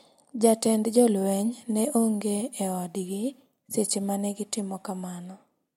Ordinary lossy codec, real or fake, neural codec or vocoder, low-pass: MP3, 64 kbps; real; none; 19.8 kHz